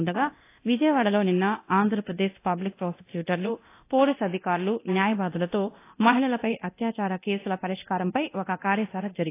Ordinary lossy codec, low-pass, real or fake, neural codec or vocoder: AAC, 24 kbps; 3.6 kHz; fake; codec, 24 kHz, 0.9 kbps, DualCodec